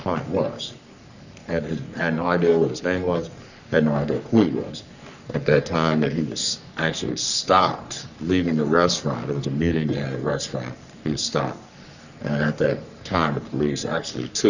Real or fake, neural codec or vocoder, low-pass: fake; codec, 44.1 kHz, 3.4 kbps, Pupu-Codec; 7.2 kHz